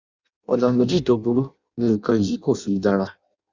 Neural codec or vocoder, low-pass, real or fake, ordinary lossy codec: codec, 16 kHz in and 24 kHz out, 0.6 kbps, FireRedTTS-2 codec; 7.2 kHz; fake; Opus, 64 kbps